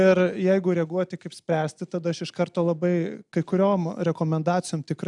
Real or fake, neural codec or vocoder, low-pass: real; none; 10.8 kHz